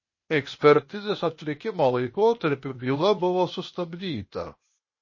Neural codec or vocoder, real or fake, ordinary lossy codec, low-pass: codec, 16 kHz, 0.8 kbps, ZipCodec; fake; MP3, 32 kbps; 7.2 kHz